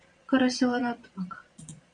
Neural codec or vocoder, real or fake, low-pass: vocoder, 22.05 kHz, 80 mel bands, Vocos; fake; 9.9 kHz